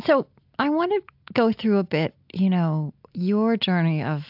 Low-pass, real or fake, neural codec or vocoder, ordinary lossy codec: 5.4 kHz; real; none; AAC, 48 kbps